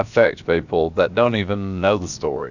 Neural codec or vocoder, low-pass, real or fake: codec, 16 kHz, about 1 kbps, DyCAST, with the encoder's durations; 7.2 kHz; fake